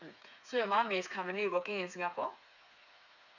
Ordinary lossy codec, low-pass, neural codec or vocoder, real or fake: none; 7.2 kHz; codec, 16 kHz, 4 kbps, FreqCodec, smaller model; fake